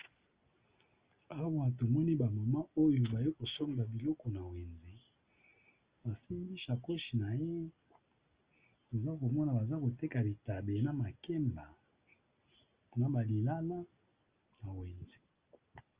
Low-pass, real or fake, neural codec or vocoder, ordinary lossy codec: 3.6 kHz; real; none; Opus, 32 kbps